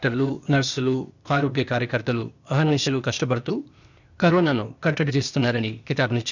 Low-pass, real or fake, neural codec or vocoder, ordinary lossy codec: 7.2 kHz; fake; codec, 16 kHz, 0.8 kbps, ZipCodec; none